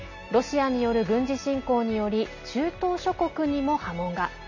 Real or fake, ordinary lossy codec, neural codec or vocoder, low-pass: real; none; none; 7.2 kHz